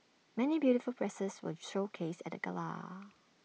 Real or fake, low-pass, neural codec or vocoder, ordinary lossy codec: real; none; none; none